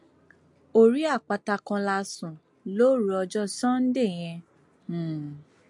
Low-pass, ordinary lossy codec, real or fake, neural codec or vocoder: 10.8 kHz; MP3, 64 kbps; real; none